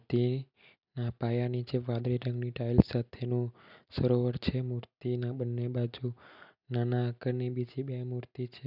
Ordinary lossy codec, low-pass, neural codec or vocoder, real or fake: none; 5.4 kHz; none; real